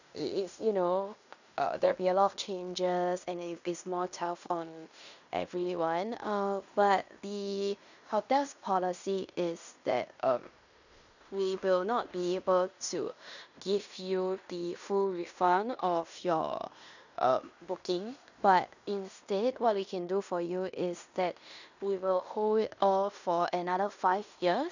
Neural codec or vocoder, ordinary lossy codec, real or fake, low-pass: codec, 16 kHz in and 24 kHz out, 0.9 kbps, LongCat-Audio-Codec, fine tuned four codebook decoder; none; fake; 7.2 kHz